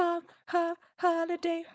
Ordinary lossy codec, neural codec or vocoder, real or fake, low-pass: none; codec, 16 kHz, 4.8 kbps, FACodec; fake; none